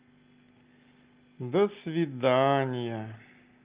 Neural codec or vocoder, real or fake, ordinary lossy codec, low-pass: none; real; Opus, 32 kbps; 3.6 kHz